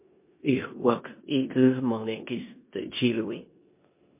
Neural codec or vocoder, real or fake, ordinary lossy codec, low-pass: codec, 16 kHz in and 24 kHz out, 0.9 kbps, LongCat-Audio-Codec, four codebook decoder; fake; MP3, 24 kbps; 3.6 kHz